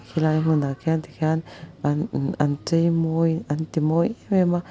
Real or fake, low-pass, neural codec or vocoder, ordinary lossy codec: real; none; none; none